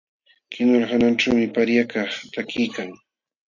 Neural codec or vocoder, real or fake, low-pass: none; real; 7.2 kHz